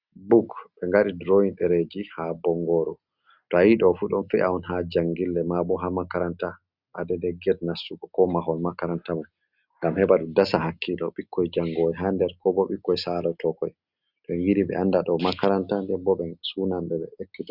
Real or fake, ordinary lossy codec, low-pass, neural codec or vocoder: real; Opus, 64 kbps; 5.4 kHz; none